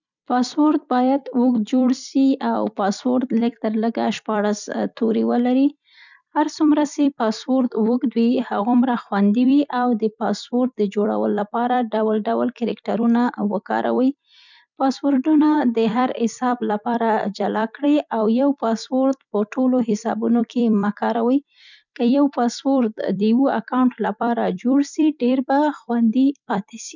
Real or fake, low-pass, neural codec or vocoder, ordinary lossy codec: fake; 7.2 kHz; vocoder, 44.1 kHz, 128 mel bands every 256 samples, BigVGAN v2; none